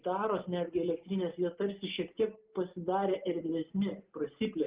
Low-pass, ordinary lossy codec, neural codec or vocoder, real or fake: 3.6 kHz; Opus, 32 kbps; none; real